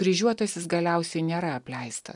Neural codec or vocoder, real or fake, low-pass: none; real; 10.8 kHz